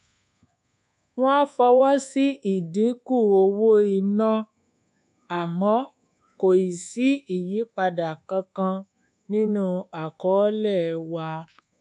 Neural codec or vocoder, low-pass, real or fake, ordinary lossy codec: codec, 24 kHz, 1.2 kbps, DualCodec; 10.8 kHz; fake; none